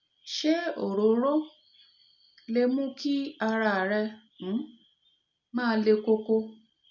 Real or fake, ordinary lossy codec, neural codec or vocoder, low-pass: real; none; none; 7.2 kHz